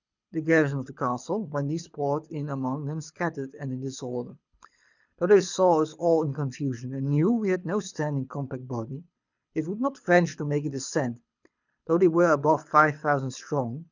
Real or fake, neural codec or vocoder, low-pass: fake; codec, 24 kHz, 6 kbps, HILCodec; 7.2 kHz